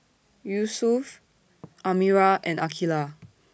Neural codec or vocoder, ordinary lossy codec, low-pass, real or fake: none; none; none; real